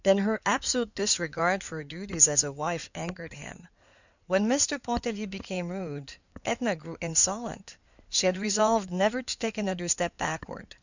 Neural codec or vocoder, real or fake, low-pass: codec, 16 kHz in and 24 kHz out, 2.2 kbps, FireRedTTS-2 codec; fake; 7.2 kHz